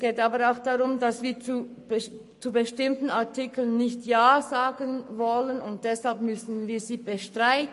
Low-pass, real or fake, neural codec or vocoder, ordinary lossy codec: 14.4 kHz; fake; codec, 44.1 kHz, 7.8 kbps, Pupu-Codec; MP3, 48 kbps